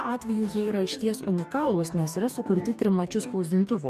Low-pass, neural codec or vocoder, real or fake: 14.4 kHz; codec, 44.1 kHz, 2.6 kbps, DAC; fake